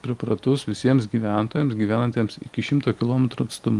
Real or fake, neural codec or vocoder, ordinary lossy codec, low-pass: real; none; Opus, 24 kbps; 10.8 kHz